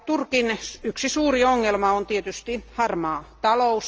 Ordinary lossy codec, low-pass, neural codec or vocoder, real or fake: Opus, 24 kbps; 7.2 kHz; none; real